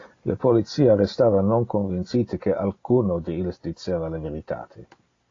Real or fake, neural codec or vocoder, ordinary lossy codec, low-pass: real; none; AAC, 32 kbps; 7.2 kHz